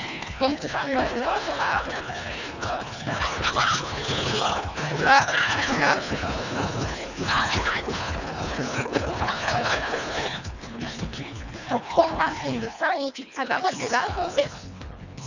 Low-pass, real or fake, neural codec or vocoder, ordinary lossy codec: 7.2 kHz; fake; codec, 24 kHz, 1.5 kbps, HILCodec; none